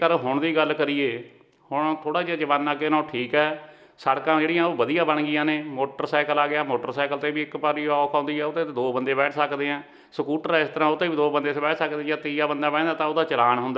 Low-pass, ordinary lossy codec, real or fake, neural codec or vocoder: none; none; real; none